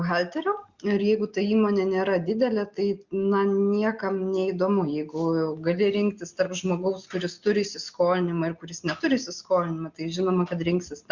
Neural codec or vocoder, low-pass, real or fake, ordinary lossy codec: none; 7.2 kHz; real; Opus, 64 kbps